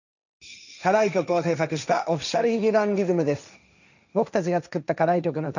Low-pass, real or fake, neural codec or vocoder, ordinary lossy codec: 7.2 kHz; fake; codec, 16 kHz, 1.1 kbps, Voila-Tokenizer; none